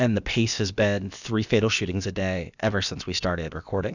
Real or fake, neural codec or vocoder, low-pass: fake; codec, 16 kHz, about 1 kbps, DyCAST, with the encoder's durations; 7.2 kHz